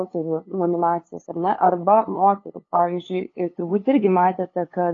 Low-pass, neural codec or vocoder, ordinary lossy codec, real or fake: 7.2 kHz; codec, 16 kHz, 2 kbps, FunCodec, trained on LibriTTS, 25 frames a second; AAC, 32 kbps; fake